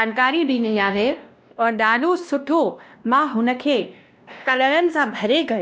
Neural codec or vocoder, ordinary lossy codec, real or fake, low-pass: codec, 16 kHz, 1 kbps, X-Codec, WavLM features, trained on Multilingual LibriSpeech; none; fake; none